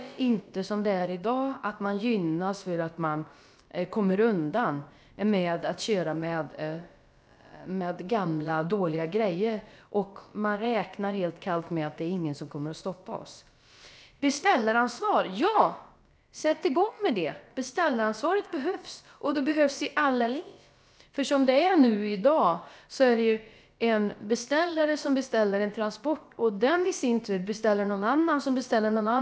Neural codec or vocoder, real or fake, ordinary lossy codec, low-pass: codec, 16 kHz, about 1 kbps, DyCAST, with the encoder's durations; fake; none; none